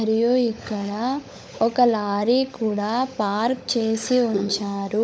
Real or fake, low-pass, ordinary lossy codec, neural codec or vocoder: fake; none; none; codec, 16 kHz, 16 kbps, FunCodec, trained on LibriTTS, 50 frames a second